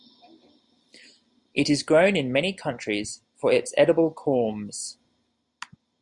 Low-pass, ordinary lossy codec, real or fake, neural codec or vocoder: 10.8 kHz; MP3, 96 kbps; real; none